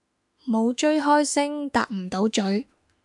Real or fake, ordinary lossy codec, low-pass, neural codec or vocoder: fake; MP3, 96 kbps; 10.8 kHz; autoencoder, 48 kHz, 32 numbers a frame, DAC-VAE, trained on Japanese speech